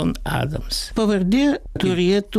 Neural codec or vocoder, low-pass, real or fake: none; 14.4 kHz; real